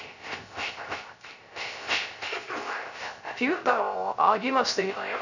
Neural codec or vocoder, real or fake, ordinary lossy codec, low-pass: codec, 16 kHz, 0.3 kbps, FocalCodec; fake; none; 7.2 kHz